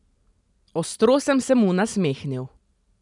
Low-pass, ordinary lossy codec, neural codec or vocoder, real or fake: 10.8 kHz; none; none; real